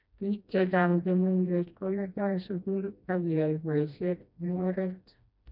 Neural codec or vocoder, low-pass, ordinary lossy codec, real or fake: codec, 16 kHz, 1 kbps, FreqCodec, smaller model; 5.4 kHz; none; fake